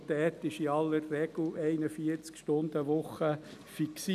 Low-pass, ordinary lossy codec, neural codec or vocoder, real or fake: 14.4 kHz; none; none; real